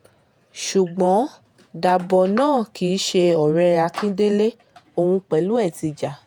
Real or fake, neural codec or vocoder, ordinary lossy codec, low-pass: fake; vocoder, 48 kHz, 128 mel bands, Vocos; none; 19.8 kHz